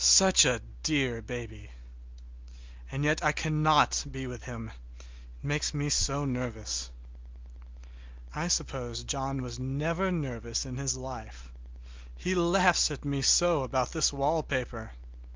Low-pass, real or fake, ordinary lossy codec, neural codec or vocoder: 7.2 kHz; real; Opus, 32 kbps; none